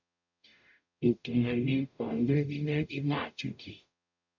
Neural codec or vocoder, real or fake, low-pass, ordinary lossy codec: codec, 44.1 kHz, 0.9 kbps, DAC; fake; 7.2 kHz; MP3, 64 kbps